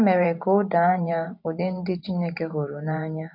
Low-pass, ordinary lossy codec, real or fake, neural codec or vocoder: 5.4 kHz; MP3, 48 kbps; fake; vocoder, 44.1 kHz, 128 mel bands every 512 samples, BigVGAN v2